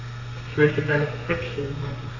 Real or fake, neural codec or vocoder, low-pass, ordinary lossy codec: fake; codec, 32 kHz, 1.9 kbps, SNAC; 7.2 kHz; none